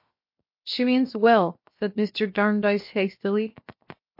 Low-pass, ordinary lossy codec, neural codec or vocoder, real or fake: 5.4 kHz; MP3, 32 kbps; codec, 16 kHz, 0.7 kbps, FocalCodec; fake